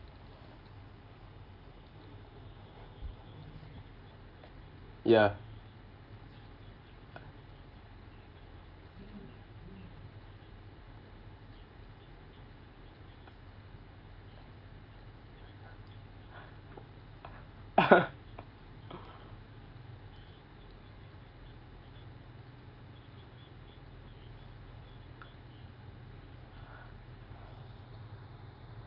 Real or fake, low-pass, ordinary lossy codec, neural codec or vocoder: real; 5.4 kHz; Opus, 24 kbps; none